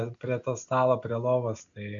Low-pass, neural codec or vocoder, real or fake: 7.2 kHz; none; real